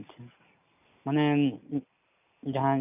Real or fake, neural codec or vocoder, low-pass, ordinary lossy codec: real; none; 3.6 kHz; none